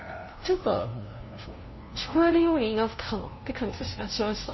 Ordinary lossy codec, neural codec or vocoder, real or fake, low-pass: MP3, 24 kbps; codec, 16 kHz, 0.5 kbps, FunCodec, trained on LibriTTS, 25 frames a second; fake; 7.2 kHz